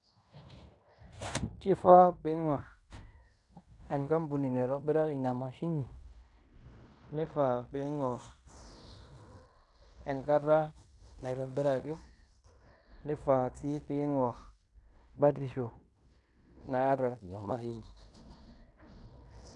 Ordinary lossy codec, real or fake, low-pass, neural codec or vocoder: none; fake; 10.8 kHz; codec, 16 kHz in and 24 kHz out, 0.9 kbps, LongCat-Audio-Codec, fine tuned four codebook decoder